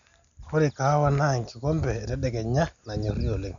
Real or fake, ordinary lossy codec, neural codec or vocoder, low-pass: real; none; none; 7.2 kHz